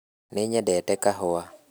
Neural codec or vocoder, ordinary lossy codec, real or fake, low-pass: none; none; real; none